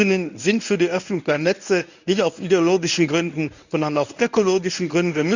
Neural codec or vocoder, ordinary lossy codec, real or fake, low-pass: codec, 24 kHz, 0.9 kbps, WavTokenizer, medium speech release version 1; none; fake; 7.2 kHz